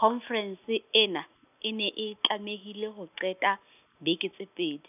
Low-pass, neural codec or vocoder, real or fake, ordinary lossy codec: 3.6 kHz; none; real; none